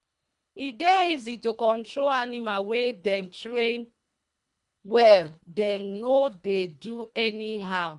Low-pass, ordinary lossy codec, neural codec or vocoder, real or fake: 10.8 kHz; MP3, 64 kbps; codec, 24 kHz, 1.5 kbps, HILCodec; fake